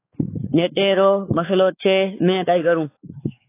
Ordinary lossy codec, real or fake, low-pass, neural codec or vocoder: AAC, 24 kbps; fake; 3.6 kHz; codec, 16 kHz, 4 kbps, X-Codec, WavLM features, trained on Multilingual LibriSpeech